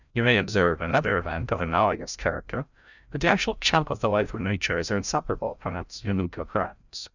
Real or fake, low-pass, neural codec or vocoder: fake; 7.2 kHz; codec, 16 kHz, 0.5 kbps, FreqCodec, larger model